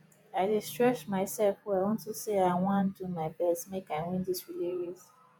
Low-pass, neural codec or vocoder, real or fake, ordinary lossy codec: none; vocoder, 48 kHz, 128 mel bands, Vocos; fake; none